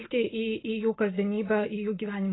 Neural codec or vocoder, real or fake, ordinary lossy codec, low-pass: none; real; AAC, 16 kbps; 7.2 kHz